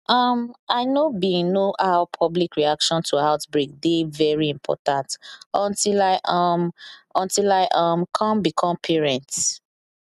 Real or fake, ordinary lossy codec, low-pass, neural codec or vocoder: real; none; 14.4 kHz; none